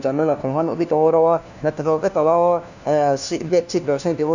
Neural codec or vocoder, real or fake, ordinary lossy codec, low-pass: codec, 16 kHz, 1 kbps, FunCodec, trained on LibriTTS, 50 frames a second; fake; none; 7.2 kHz